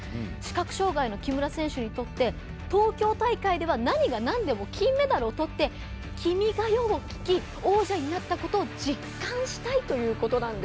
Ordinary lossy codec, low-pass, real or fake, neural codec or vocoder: none; none; real; none